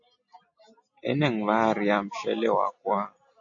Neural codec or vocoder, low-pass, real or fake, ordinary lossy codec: none; 7.2 kHz; real; AAC, 48 kbps